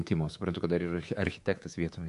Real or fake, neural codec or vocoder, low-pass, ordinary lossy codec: fake; codec, 24 kHz, 3.1 kbps, DualCodec; 10.8 kHz; AAC, 64 kbps